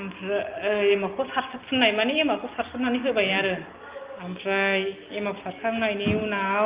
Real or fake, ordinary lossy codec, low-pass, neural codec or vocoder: real; Opus, 32 kbps; 3.6 kHz; none